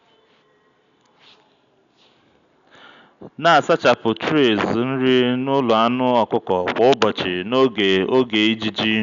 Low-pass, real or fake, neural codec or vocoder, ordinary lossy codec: 7.2 kHz; real; none; none